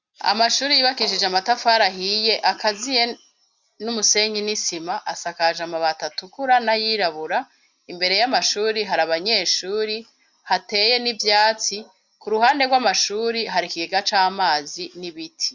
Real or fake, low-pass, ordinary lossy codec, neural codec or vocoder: real; 7.2 kHz; Opus, 64 kbps; none